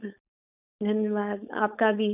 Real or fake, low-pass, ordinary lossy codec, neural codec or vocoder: fake; 3.6 kHz; none; codec, 16 kHz, 4.8 kbps, FACodec